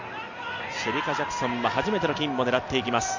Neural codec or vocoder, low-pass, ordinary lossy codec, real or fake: none; 7.2 kHz; none; real